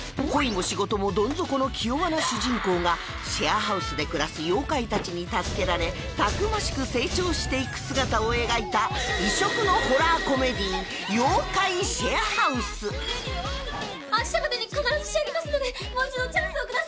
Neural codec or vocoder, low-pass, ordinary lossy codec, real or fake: none; none; none; real